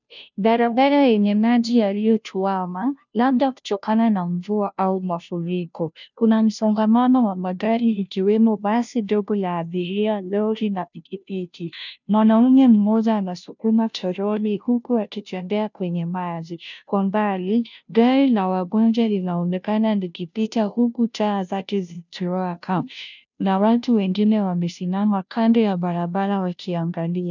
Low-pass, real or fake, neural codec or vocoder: 7.2 kHz; fake; codec, 16 kHz, 0.5 kbps, FunCodec, trained on Chinese and English, 25 frames a second